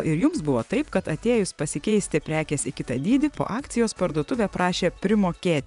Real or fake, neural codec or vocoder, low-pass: fake; vocoder, 24 kHz, 100 mel bands, Vocos; 10.8 kHz